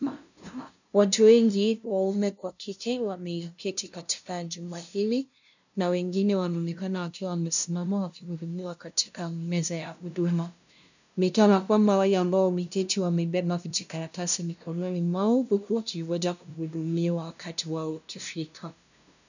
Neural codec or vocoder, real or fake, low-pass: codec, 16 kHz, 0.5 kbps, FunCodec, trained on LibriTTS, 25 frames a second; fake; 7.2 kHz